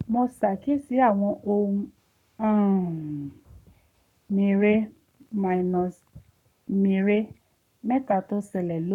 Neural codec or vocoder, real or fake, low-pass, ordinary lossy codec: codec, 44.1 kHz, 7.8 kbps, Pupu-Codec; fake; 19.8 kHz; none